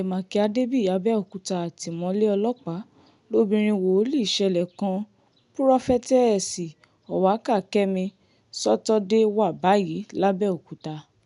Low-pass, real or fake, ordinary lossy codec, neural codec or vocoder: 10.8 kHz; real; none; none